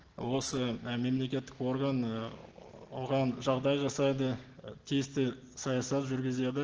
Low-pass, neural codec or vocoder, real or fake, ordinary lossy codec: 7.2 kHz; none; real; Opus, 16 kbps